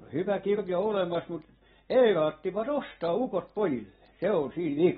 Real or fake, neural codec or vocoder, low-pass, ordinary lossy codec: real; none; 7.2 kHz; AAC, 16 kbps